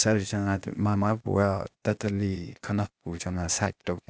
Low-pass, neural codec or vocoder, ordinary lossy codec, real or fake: none; codec, 16 kHz, 0.8 kbps, ZipCodec; none; fake